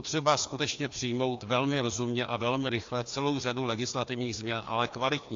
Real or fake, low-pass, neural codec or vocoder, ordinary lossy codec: fake; 7.2 kHz; codec, 16 kHz, 2 kbps, FreqCodec, larger model; AAC, 48 kbps